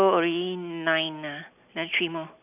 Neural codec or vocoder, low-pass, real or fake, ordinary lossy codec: none; 3.6 kHz; real; none